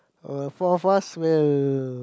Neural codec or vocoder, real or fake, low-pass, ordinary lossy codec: none; real; none; none